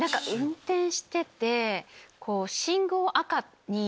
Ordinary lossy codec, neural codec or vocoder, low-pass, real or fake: none; none; none; real